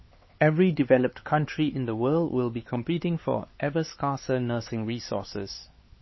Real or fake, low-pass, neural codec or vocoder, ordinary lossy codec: fake; 7.2 kHz; codec, 16 kHz, 4 kbps, X-Codec, HuBERT features, trained on LibriSpeech; MP3, 24 kbps